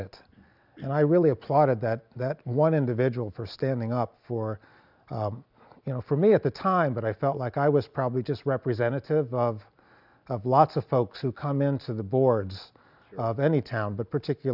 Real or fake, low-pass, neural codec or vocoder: real; 5.4 kHz; none